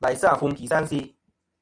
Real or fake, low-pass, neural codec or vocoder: real; 9.9 kHz; none